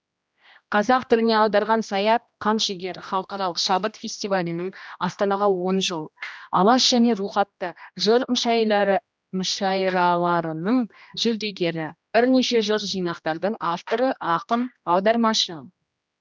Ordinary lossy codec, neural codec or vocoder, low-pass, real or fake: none; codec, 16 kHz, 1 kbps, X-Codec, HuBERT features, trained on general audio; none; fake